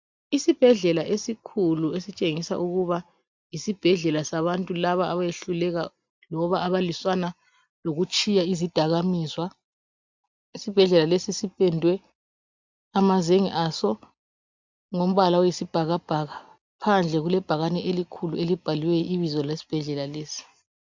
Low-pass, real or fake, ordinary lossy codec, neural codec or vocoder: 7.2 kHz; real; MP3, 64 kbps; none